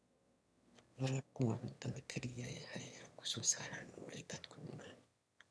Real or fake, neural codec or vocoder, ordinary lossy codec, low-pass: fake; autoencoder, 22.05 kHz, a latent of 192 numbers a frame, VITS, trained on one speaker; none; none